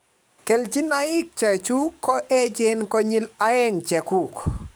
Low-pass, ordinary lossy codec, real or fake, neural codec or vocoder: none; none; fake; codec, 44.1 kHz, 7.8 kbps, DAC